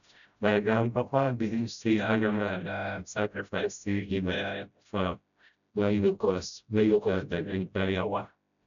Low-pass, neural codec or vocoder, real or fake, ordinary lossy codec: 7.2 kHz; codec, 16 kHz, 0.5 kbps, FreqCodec, smaller model; fake; none